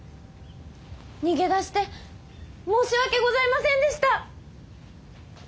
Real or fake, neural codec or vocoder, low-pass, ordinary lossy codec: real; none; none; none